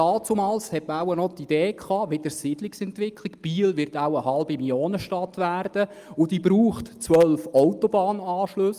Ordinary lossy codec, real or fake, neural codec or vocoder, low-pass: Opus, 32 kbps; real; none; 14.4 kHz